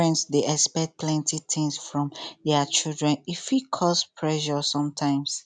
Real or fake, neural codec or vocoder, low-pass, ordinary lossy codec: real; none; 9.9 kHz; none